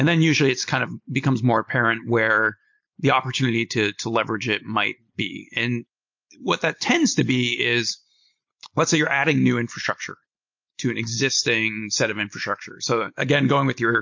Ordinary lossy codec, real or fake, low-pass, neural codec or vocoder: MP3, 48 kbps; real; 7.2 kHz; none